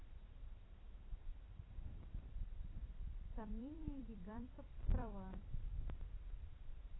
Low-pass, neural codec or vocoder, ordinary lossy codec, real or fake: 7.2 kHz; vocoder, 44.1 kHz, 128 mel bands every 256 samples, BigVGAN v2; AAC, 16 kbps; fake